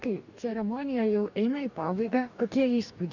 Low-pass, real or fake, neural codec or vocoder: 7.2 kHz; fake; codec, 44.1 kHz, 2.6 kbps, DAC